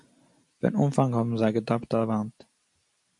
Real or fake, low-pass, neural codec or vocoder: real; 10.8 kHz; none